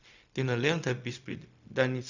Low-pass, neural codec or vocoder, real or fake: 7.2 kHz; codec, 16 kHz, 0.4 kbps, LongCat-Audio-Codec; fake